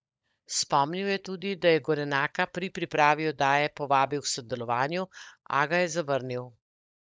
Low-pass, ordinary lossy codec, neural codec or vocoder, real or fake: none; none; codec, 16 kHz, 16 kbps, FunCodec, trained on LibriTTS, 50 frames a second; fake